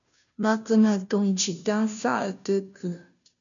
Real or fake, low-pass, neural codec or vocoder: fake; 7.2 kHz; codec, 16 kHz, 0.5 kbps, FunCodec, trained on Chinese and English, 25 frames a second